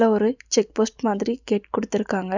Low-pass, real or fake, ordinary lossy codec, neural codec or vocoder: 7.2 kHz; real; none; none